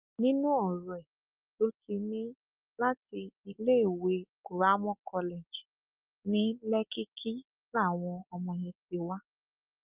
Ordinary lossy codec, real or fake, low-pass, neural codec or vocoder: Opus, 24 kbps; real; 3.6 kHz; none